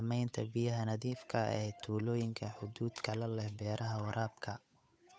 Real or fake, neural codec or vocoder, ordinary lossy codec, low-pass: fake; codec, 16 kHz, 8 kbps, FunCodec, trained on Chinese and English, 25 frames a second; none; none